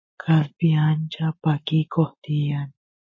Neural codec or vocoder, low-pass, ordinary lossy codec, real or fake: none; 7.2 kHz; MP3, 32 kbps; real